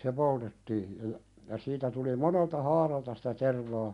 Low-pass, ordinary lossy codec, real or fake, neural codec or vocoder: 10.8 kHz; none; real; none